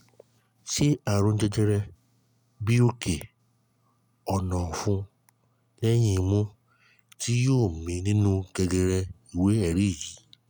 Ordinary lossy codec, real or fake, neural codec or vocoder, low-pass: none; real; none; none